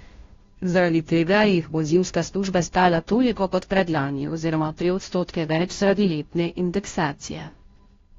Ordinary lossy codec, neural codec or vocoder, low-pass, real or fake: AAC, 32 kbps; codec, 16 kHz, 0.5 kbps, FunCodec, trained on Chinese and English, 25 frames a second; 7.2 kHz; fake